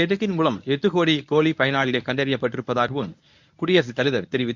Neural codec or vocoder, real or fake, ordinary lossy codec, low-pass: codec, 24 kHz, 0.9 kbps, WavTokenizer, medium speech release version 1; fake; none; 7.2 kHz